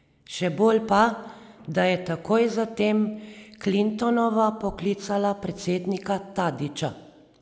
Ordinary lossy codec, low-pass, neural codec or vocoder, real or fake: none; none; none; real